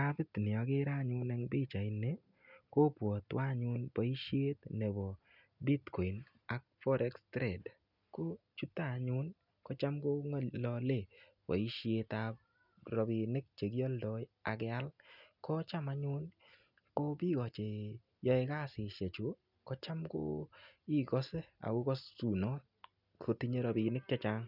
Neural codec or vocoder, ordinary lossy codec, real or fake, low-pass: none; none; real; 5.4 kHz